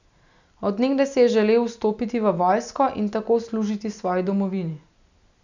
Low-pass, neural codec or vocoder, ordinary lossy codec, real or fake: 7.2 kHz; none; none; real